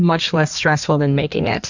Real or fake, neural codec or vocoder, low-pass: fake; codec, 16 kHz in and 24 kHz out, 1.1 kbps, FireRedTTS-2 codec; 7.2 kHz